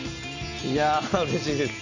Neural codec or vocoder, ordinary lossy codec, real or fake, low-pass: none; none; real; 7.2 kHz